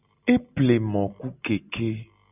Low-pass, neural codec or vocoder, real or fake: 3.6 kHz; none; real